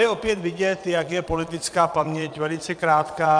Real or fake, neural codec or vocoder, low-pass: fake; vocoder, 22.05 kHz, 80 mel bands, Vocos; 9.9 kHz